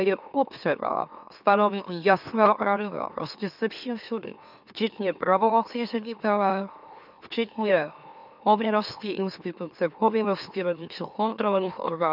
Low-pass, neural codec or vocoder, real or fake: 5.4 kHz; autoencoder, 44.1 kHz, a latent of 192 numbers a frame, MeloTTS; fake